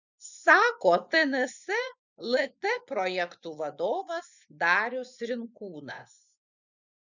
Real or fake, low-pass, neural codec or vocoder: fake; 7.2 kHz; vocoder, 24 kHz, 100 mel bands, Vocos